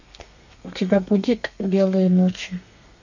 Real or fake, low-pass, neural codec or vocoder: fake; 7.2 kHz; codec, 32 kHz, 1.9 kbps, SNAC